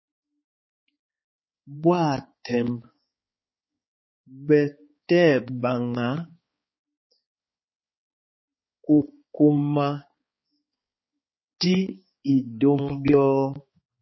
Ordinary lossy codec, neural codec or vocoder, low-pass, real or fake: MP3, 24 kbps; codec, 16 kHz, 4 kbps, X-Codec, HuBERT features, trained on balanced general audio; 7.2 kHz; fake